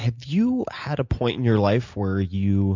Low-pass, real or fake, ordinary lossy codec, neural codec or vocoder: 7.2 kHz; real; MP3, 64 kbps; none